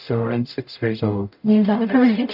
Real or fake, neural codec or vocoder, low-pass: fake; codec, 44.1 kHz, 0.9 kbps, DAC; 5.4 kHz